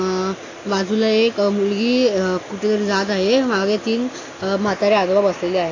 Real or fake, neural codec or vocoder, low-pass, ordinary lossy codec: real; none; 7.2 kHz; AAC, 32 kbps